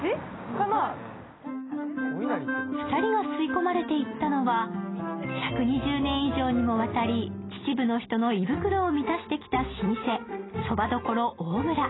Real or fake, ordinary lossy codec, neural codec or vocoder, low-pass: real; AAC, 16 kbps; none; 7.2 kHz